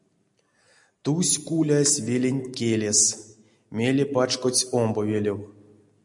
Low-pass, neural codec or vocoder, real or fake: 10.8 kHz; none; real